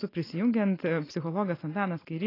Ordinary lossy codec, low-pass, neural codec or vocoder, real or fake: AAC, 24 kbps; 5.4 kHz; none; real